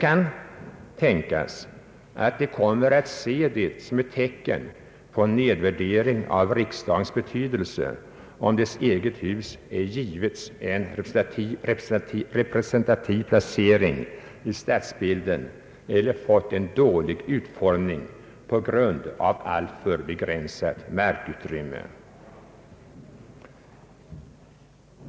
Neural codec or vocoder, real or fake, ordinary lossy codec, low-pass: none; real; none; none